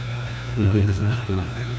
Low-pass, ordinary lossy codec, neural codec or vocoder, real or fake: none; none; codec, 16 kHz, 1 kbps, FunCodec, trained on LibriTTS, 50 frames a second; fake